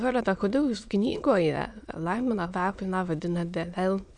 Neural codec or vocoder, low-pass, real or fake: autoencoder, 22.05 kHz, a latent of 192 numbers a frame, VITS, trained on many speakers; 9.9 kHz; fake